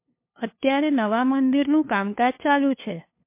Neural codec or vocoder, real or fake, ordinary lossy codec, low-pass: codec, 16 kHz, 2 kbps, FunCodec, trained on LibriTTS, 25 frames a second; fake; MP3, 24 kbps; 3.6 kHz